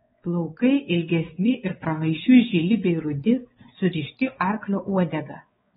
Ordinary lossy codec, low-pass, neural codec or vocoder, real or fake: AAC, 16 kbps; 7.2 kHz; codec, 16 kHz, 4 kbps, X-Codec, WavLM features, trained on Multilingual LibriSpeech; fake